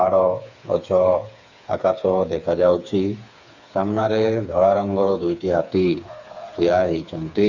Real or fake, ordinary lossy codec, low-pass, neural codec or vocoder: fake; none; 7.2 kHz; codec, 16 kHz, 4 kbps, FreqCodec, smaller model